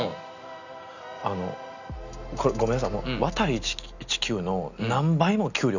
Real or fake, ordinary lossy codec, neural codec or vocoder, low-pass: real; none; none; 7.2 kHz